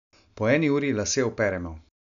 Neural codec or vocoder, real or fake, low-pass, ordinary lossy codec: none; real; 7.2 kHz; none